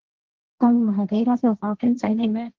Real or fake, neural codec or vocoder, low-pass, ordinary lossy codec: fake; codec, 16 kHz, 1.1 kbps, Voila-Tokenizer; 7.2 kHz; Opus, 16 kbps